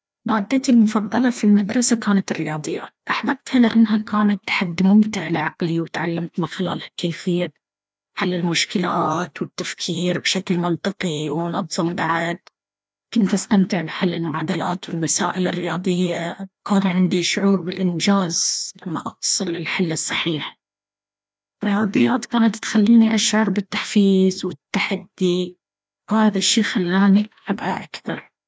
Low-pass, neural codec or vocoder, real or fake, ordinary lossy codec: none; codec, 16 kHz, 1 kbps, FreqCodec, larger model; fake; none